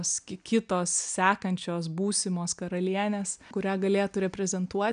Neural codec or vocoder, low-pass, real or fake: none; 9.9 kHz; real